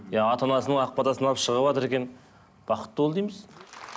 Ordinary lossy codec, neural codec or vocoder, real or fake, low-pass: none; none; real; none